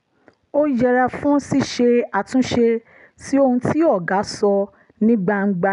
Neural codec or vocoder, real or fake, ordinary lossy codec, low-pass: none; real; none; 10.8 kHz